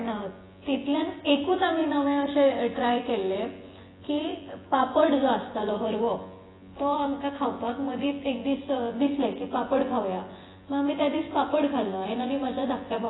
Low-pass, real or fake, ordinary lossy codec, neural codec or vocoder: 7.2 kHz; fake; AAC, 16 kbps; vocoder, 24 kHz, 100 mel bands, Vocos